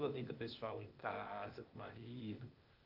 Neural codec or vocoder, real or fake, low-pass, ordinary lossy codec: codec, 16 kHz, 0.8 kbps, ZipCodec; fake; 5.4 kHz; Opus, 32 kbps